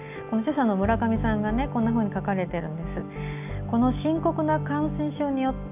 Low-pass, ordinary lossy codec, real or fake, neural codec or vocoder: 3.6 kHz; none; real; none